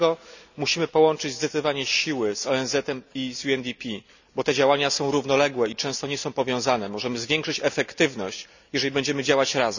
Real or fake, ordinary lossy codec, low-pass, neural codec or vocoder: real; none; 7.2 kHz; none